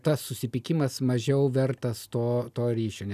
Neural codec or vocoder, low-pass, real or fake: none; 14.4 kHz; real